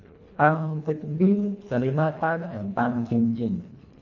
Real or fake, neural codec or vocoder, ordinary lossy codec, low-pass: fake; codec, 24 kHz, 1.5 kbps, HILCodec; none; 7.2 kHz